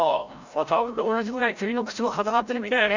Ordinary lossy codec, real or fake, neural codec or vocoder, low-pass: none; fake; codec, 16 kHz, 0.5 kbps, FreqCodec, larger model; 7.2 kHz